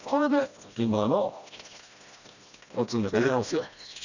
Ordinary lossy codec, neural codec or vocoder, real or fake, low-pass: none; codec, 16 kHz, 1 kbps, FreqCodec, smaller model; fake; 7.2 kHz